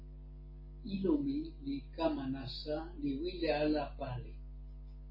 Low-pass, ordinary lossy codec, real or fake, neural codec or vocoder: 5.4 kHz; MP3, 24 kbps; real; none